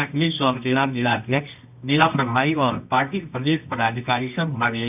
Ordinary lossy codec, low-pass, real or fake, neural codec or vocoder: none; 3.6 kHz; fake; codec, 24 kHz, 0.9 kbps, WavTokenizer, medium music audio release